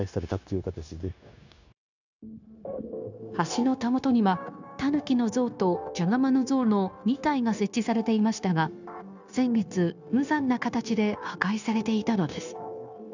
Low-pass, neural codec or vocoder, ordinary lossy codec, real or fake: 7.2 kHz; codec, 16 kHz, 0.9 kbps, LongCat-Audio-Codec; none; fake